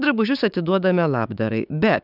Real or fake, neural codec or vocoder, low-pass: fake; codec, 16 kHz, 6 kbps, DAC; 5.4 kHz